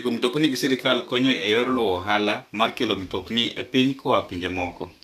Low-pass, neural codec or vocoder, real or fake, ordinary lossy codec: 14.4 kHz; codec, 32 kHz, 1.9 kbps, SNAC; fake; none